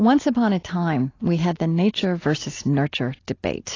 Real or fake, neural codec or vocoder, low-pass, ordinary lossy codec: real; none; 7.2 kHz; AAC, 32 kbps